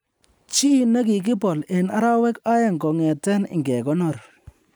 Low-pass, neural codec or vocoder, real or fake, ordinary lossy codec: none; none; real; none